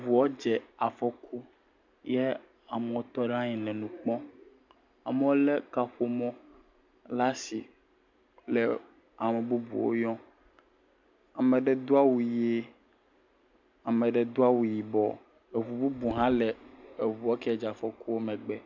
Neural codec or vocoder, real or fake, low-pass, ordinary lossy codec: none; real; 7.2 kHz; MP3, 64 kbps